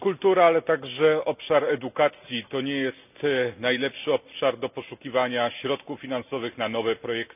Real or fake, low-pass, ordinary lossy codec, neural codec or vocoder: real; 3.6 kHz; none; none